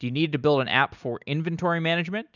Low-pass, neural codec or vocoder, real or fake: 7.2 kHz; none; real